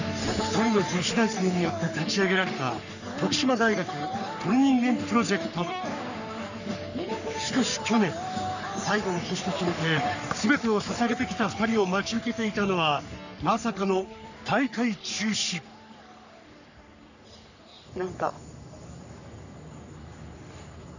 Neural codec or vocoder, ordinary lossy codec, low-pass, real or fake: codec, 44.1 kHz, 3.4 kbps, Pupu-Codec; none; 7.2 kHz; fake